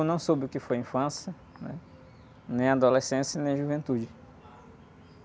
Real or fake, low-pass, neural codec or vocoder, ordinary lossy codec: real; none; none; none